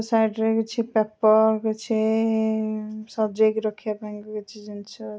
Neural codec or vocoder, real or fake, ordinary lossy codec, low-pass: none; real; none; none